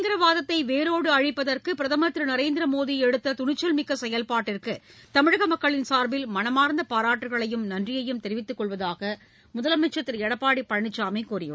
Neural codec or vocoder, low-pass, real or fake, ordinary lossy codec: none; none; real; none